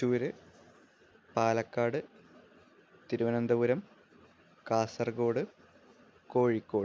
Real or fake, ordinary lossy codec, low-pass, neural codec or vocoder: real; none; none; none